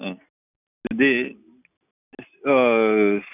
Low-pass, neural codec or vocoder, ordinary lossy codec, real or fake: 3.6 kHz; none; none; real